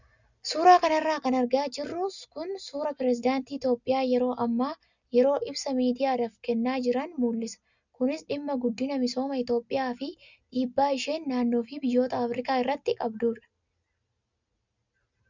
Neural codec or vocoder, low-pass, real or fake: none; 7.2 kHz; real